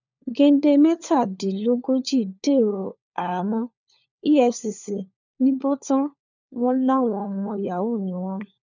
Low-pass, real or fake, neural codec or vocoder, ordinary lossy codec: 7.2 kHz; fake; codec, 16 kHz, 4 kbps, FunCodec, trained on LibriTTS, 50 frames a second; none